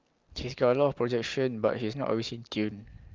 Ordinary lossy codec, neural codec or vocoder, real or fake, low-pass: Opus, 24 kbps; none; real; 7.2 kHz